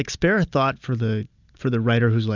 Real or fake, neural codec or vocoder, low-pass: real; none; 7.2 kHz